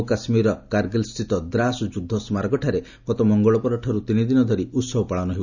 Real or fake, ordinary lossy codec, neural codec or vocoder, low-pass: real; none; none; 7.2 kHz